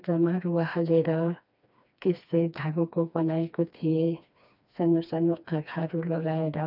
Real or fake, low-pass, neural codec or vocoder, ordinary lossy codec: fake; 5.4 kHz; codec, 16 kHz, 2 kbps, FreqCodec, smaller model; none